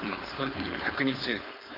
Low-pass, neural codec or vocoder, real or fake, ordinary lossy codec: 5.4 kHz; codec, 16 kHz, 4.8 kbps, FACodec; fake; none